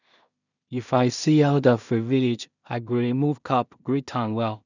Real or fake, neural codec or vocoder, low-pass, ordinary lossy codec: fake; codec, 16 kHz in and 24 kHz out, 0.4 kbps, LongCat-Audio-Codec, two codebook decoder; 7.2 kHz; none